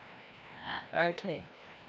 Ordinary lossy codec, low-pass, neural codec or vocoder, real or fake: none; none; codec, 16 kHz, 1 kbps, FreqCodec, larger model; fake